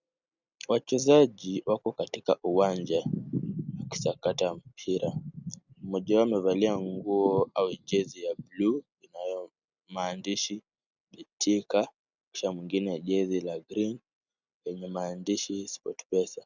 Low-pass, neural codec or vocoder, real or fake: 7.2 kHz; none; real